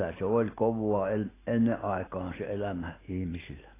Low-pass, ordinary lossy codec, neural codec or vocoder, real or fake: 3.6 kHz; AAC, 16 kbps; none; real